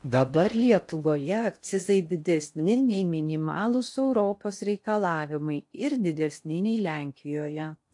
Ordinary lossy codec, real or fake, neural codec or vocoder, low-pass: AAC, 64 kbps; fake; codec, 16 kHz in and 24 kHz out, 0.6 kbps, FocalCodec, streaming, 4096 codes; 10.8 kHz